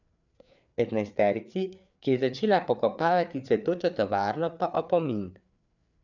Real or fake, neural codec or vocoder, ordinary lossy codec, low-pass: fake; codec, 16 kHz, 4 kbps, FreqCodec, larger model; none; 7.2 kHz